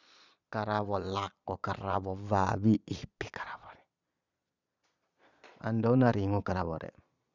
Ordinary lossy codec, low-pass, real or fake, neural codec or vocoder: none; 7.2 kHz; fake; vocoder, 22.05 kHz, 80 mel bands, Vocos